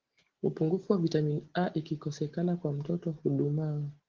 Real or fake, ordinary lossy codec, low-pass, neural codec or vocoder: real; Opus, 16 kbps; 7.2 kHz; none